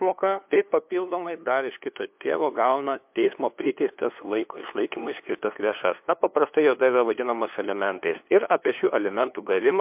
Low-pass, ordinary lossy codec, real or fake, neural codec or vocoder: 3.6 kHz; MP3, 32 kbps; fake; codec, 16 kHz, 2 kbps, FunCodec, trained on LibriTTS, 25 frames a second